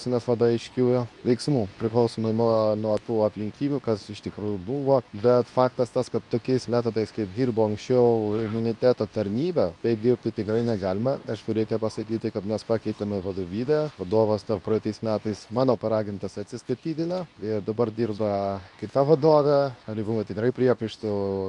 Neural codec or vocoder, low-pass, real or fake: codec, 24 kHz, 0.9 kbps, WavTokenizer, medium speech release version 2; 10.8 kHz; fake